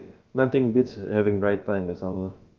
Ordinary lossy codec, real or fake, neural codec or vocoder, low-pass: Opus, 32 kbps; fake; codec, 16 kHz, about 1 kbps, DyCAST, with the encoder's durations; 7.2 kHz